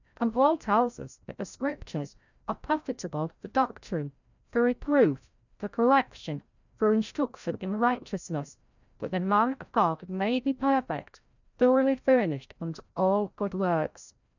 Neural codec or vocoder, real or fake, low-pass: codec, 16 kHz, 0.5 kbps, FreqCodec, larger model; fake; 7.2 kHz